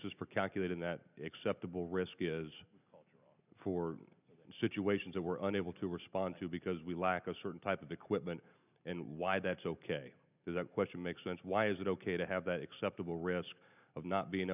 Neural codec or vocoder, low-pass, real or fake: none; 3.6 kHz; real